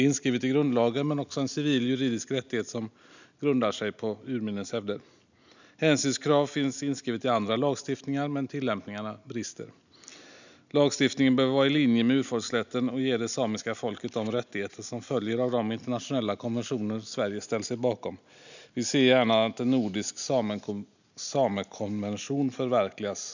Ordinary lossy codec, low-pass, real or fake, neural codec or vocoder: none; 7.2 kHz; real; none